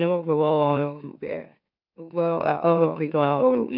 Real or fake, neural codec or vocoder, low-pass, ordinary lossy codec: fake; autoencoder, 44.1 kHz, a latent of 192 numbers a frame, MeloTTS; 5.4 kHz; none